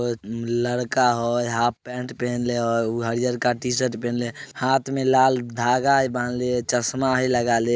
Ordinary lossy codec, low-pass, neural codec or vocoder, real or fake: none; none; none; real